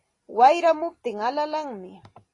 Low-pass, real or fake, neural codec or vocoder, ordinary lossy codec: 10.8 kHz; real; none; AAC, 32 kbps